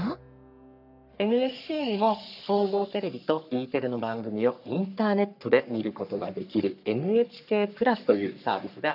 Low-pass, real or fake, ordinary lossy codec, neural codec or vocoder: 5.4 kHz; fake; none; codec, 44.1 kHz, 3.4 kbps, Pupu-Codec